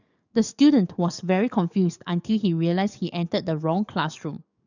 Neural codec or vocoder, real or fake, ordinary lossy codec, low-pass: codec, 44.1 kHz, 7.8 kbps, DAC; fake; none; 7.2 kHz